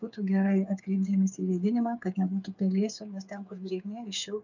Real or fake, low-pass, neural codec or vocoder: fake; 7.2 kHz; codec, 16 kHz, 8 kbps, FreqCodec, smaller model